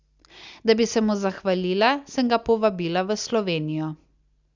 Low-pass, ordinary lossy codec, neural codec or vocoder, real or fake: 7.2 kHz; Opus, 64 kbps; none; real